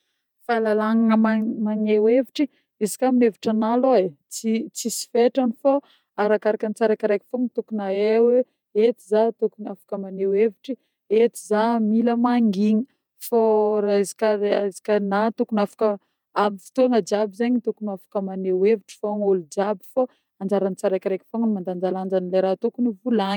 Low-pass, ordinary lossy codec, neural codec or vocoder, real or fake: 19.8 kHz; none; vocoder, 48 kHz, 128 mel bands, Vocos; fake